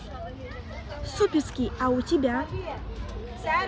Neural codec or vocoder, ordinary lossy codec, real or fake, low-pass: none; none; real; none